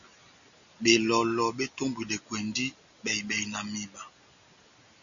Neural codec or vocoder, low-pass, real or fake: none; 7.2 kHz; real